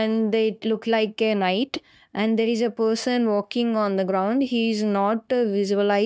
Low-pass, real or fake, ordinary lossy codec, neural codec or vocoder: none; fake; none; codec, 16 kHz, 0.9 kbps, LongCat-Audio-Codec